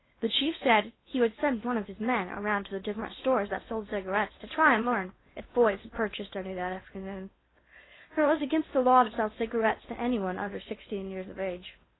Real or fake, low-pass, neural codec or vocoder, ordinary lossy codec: fake; 7.2 kHz; codec, 16 kHz in and 24 kHz out, 0.8 kbps, FocalCodec, streaming, 65536 codes; AAC, 16 kbps